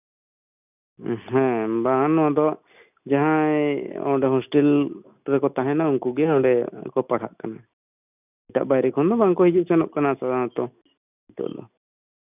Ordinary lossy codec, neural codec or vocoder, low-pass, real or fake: none; none; 3.6 kHz; real